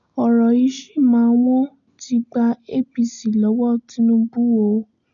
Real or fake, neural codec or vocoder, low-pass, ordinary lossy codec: real; none; 7.2 kHz; none